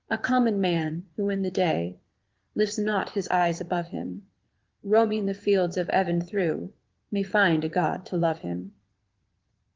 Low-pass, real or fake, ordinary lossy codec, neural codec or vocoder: 7.2 kHz; fake; Opus, 16 kbps; vocoder, 22.05 kHz, 80 mel bands, Vocos